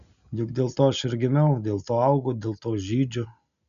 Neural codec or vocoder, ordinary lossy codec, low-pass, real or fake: none; MP3, 96 kbps; 7.2 kHz; real